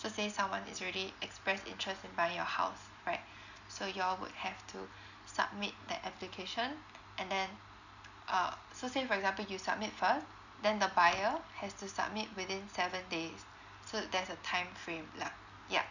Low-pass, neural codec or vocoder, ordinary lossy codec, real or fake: 7.2 kHz; none; none; real